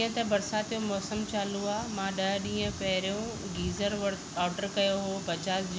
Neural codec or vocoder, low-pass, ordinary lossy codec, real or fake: none; none; none; real